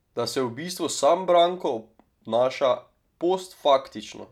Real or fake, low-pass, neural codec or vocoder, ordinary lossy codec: real; 19.8 kHz; none; none